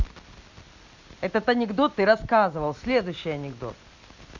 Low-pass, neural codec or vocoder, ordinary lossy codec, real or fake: 7.2 kHz; none; Opus, 64 kbps; real